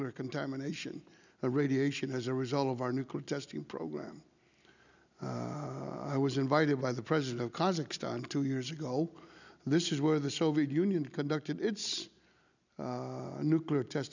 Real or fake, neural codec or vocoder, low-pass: real; none; 7.2 kHz